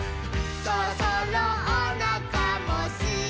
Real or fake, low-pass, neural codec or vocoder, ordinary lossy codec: real; none; none; none